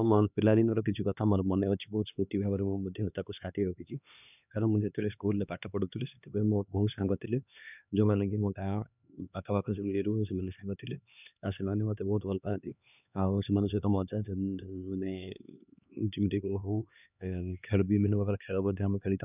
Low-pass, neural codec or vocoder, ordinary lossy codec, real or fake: 3.6 kHz; codec, 16 kHz, 2 kbps, X-Codec, HuBERT features, trained on LibriSpeech; none; fake